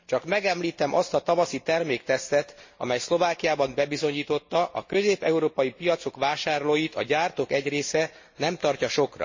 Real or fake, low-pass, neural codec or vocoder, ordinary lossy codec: real; 7.2 kHz; none; MP3, 32 kbps